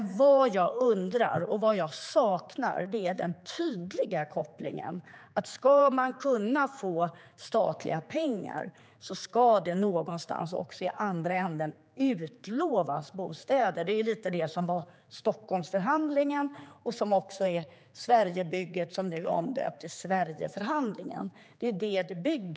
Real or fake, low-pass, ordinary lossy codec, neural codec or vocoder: fake; none; none; codec, 16 kHz, 4 kbps, X-Codec, HuBERT features, trained on general audio